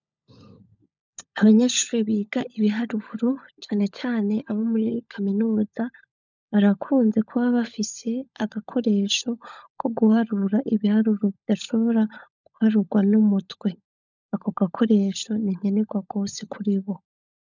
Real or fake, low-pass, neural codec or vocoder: fake; 7.2 kHz; codec, 16 kHz, 16 kbps, FunCodec, trained on LibriTTS, 50 frames a second